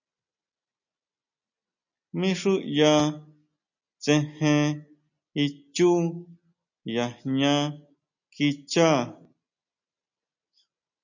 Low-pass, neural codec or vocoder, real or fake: 7.2 kHz; none; real